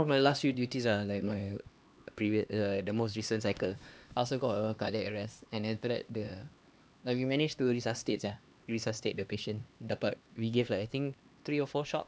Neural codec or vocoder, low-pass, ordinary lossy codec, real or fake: codec, 16 kHz, 2 kbps, X-Codec, HuBERT features, trained on LibriSpeech; none; none; fake